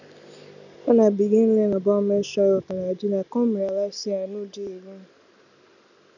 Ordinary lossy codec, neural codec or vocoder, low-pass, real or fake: none; none; 7.2 kHz; real